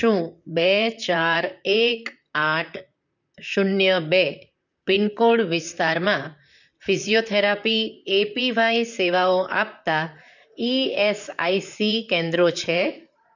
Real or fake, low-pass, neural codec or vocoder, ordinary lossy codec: fake; 7.2 kHz; vocoder, 44.1 kHz, 128 mel bands, Pupu-Vocoder; none